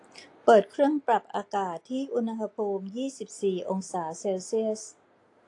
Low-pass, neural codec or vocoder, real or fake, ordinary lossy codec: 10.8 kHz; none; real; AAC, 48 kbps